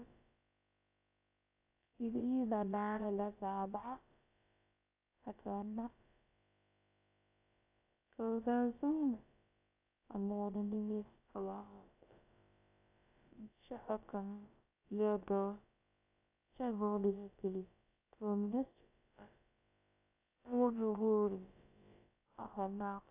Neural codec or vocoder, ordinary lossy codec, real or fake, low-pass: codec, 16 kHz, about 1 kbps, DyCAST, with the encoder's durations; AAC, 32 kbps; fake; 3.6 kHz